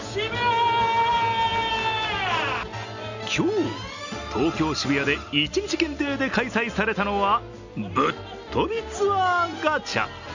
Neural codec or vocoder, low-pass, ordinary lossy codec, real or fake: none; 7.2 kHz; none; real